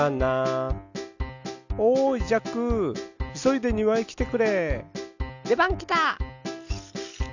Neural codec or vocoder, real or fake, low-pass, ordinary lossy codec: none; real; 7.2 kHz; none